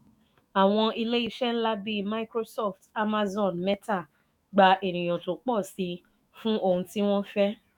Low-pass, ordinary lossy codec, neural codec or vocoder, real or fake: 19.8 kHz; none; autoencoder, 48 kHz, 128 numbers a frame, DAC-VAE, trained on Japanese speech; fake